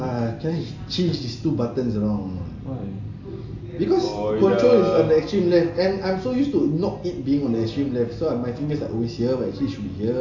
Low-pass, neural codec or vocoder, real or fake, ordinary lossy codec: 7.2 kHz; none; real; AAC, 48 kbps